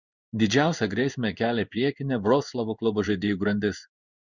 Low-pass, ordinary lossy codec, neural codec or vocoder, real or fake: 7.2 kHz; Opus, 64 kbps; codec, 16 kHz in and 24 kHz out, 1 kbps, XY-Tokenizer; fake